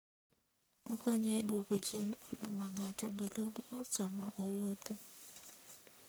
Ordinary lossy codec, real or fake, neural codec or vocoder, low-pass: none; fake; codec, 44.1 kHz, 1.7 kbps, Pupu-Codec; none